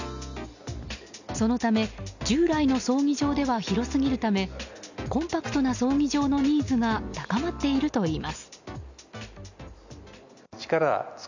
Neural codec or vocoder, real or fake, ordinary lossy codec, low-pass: none; real; none; 7.2 kHz